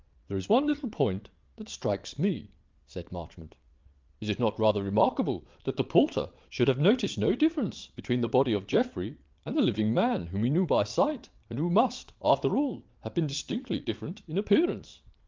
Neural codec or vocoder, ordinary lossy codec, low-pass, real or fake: vocoder, 22.05 kHz, 80 mel bands, Vocos; Opus, 24 kbps; 7.2 kHz; fake